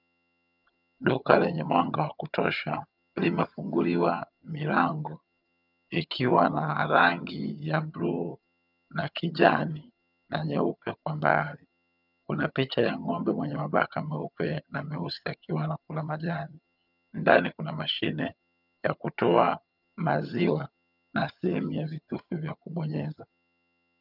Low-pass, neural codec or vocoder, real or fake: 5.4 kHz; vocoder, 22.05 kHz, 80 mel bands, HiFi-GAN; fake